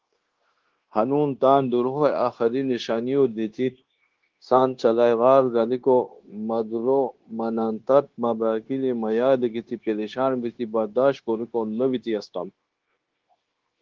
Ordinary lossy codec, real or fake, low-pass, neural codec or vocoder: Opus, 16 kbps; fake; 7.2 kHz; codec, 16 kHz, 0.9 kbps, LongCat-Audio-Codec